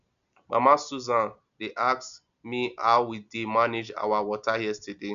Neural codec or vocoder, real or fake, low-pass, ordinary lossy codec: none; real; 7.2 kHz; none